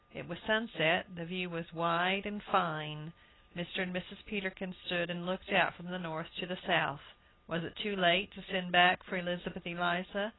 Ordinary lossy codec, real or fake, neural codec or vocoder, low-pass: AAC, 16 kbps; real; none; 7.2 kHz